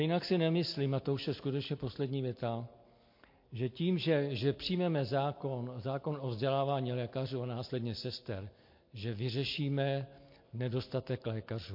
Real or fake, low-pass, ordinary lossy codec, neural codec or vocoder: real; 5.4 kHz; MP3, 32 kbps; none